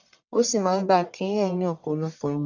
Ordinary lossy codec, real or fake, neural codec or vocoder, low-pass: none; fake; codec, 44.1 kHz, 1.7 kbps, Pupu-Codec; 7.2 kHz